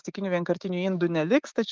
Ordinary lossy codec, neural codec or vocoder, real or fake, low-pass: Opus, 32 kbps; codec, 16 kHz, 6 kbps, DAC; fake; 7.2 kHz